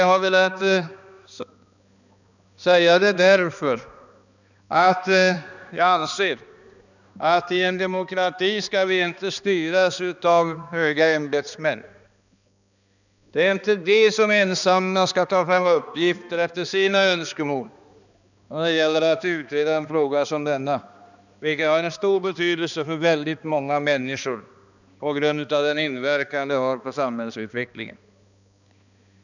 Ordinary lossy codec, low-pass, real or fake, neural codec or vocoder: none; 7.2 kHz; fake; codec, 16 kHz, 2 kbps, X-Codec, HuBERT features, trained on balanced general audio